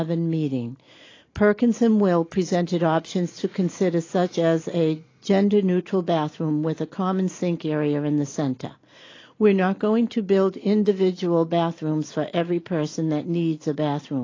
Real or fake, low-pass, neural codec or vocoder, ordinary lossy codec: real; 7.2 kHz; none; AAC, 32 kbps